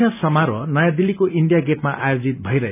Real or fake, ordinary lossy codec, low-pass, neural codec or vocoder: real; none; 3.6 kHz; none